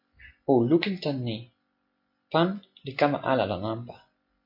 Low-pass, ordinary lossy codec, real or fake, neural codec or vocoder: 5.4 kHz; MP3, 32 kbps; real; none